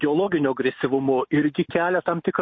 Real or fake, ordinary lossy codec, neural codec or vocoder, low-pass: real; MP3, 32 kbps; none; 7.2 kHz